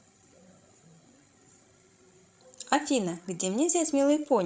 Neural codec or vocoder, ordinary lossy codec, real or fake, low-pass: codec, 16 kHz, 16 kbps, FreqCodec, larger model; none; fake; none